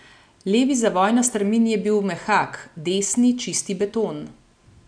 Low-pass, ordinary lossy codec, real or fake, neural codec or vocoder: 9.9 kHz; none; real; none